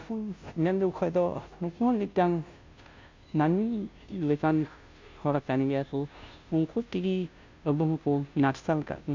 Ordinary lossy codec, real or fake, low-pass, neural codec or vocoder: MP3, 64 kbps; fake; 7.2 kHz; codec, 16 kHz, 0.5 kbps, FunCodec, trained on Chinese and English, 25 frames a second